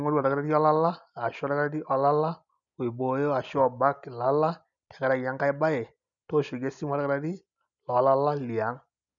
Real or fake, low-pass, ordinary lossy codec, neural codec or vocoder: real; 7.2 kHz; none; none